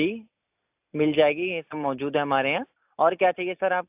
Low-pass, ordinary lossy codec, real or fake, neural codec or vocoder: 3.6 kHz; AAC, 32 kbps; real; none